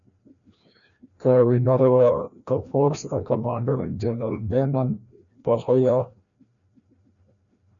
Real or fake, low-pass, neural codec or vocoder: fake; 7.2 kHz; codec, 16 kHz, 1 kbps, FreqCodec, larger model